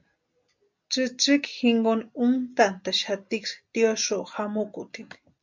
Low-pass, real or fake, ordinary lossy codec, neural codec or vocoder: 7.2 kHz; real; MP3, 64 kbps; none